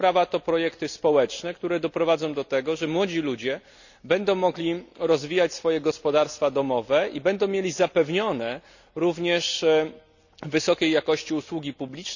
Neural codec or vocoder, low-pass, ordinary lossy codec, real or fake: none; 7.2 kHz; none; real